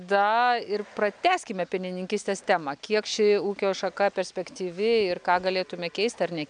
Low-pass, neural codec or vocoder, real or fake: 9.9 kHz; none; real